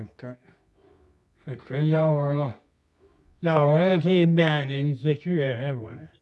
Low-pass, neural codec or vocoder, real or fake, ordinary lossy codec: none; codec, 24 kHz, 0.9 kbps, WavTokenizer, medium music audio release; fake; none